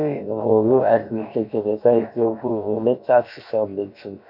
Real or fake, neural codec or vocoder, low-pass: fake; codec, 16 kHz, about 1 kbps, DyCAST, with the encoder's durations; 5.4 kHz